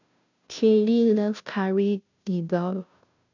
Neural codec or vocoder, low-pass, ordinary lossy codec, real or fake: codec, 16 kHz, 0.5 kbps, FunCodec, trained on Chinese and English, 25 frames a second; 7.2 kHz; none; fake